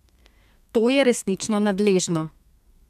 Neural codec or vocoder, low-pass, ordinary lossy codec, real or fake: codec, 32 kHz, 1.9 kbps, SNAC; 14.4 kHz; none; fake